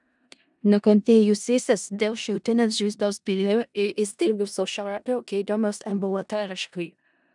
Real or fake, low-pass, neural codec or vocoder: fake; 10.8 kHz; codec, 16 kHz in and 24 kHz out, 0.4 kbps, LongCat-Audio-Codec, four codebook decoder